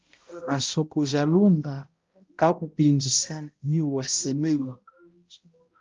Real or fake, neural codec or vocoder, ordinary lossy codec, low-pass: fake; codec, 16 kHz, 0.5 kbps, X-Codec, HuBERT features, trained on balanced general audio; Opus, 32 kbps; 7.2 kHz